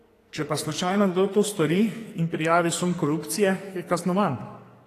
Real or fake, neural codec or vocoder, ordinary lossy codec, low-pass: fake; codec, 44.1 kHz, 2.6 kbps, SNAC; AAC, 48 kbps; 14.4 kHz